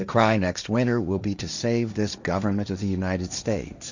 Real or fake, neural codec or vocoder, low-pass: fake; codec, 16 kHz, 1.1 kbps, Voila-Tokenizer; 7.2 kHz